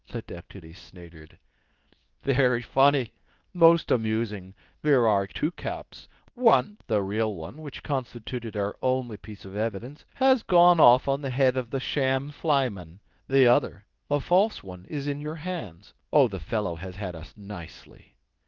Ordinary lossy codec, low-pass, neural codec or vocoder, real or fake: Opus, 32 kbps; 7.2 kHz; codec, 24 kHz, 0.9 kbps, WavTokenizer, medium speech release version 2; fake